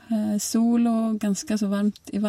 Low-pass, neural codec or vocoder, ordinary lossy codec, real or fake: 19.8 kHz; none; MP3, 64 kbps; real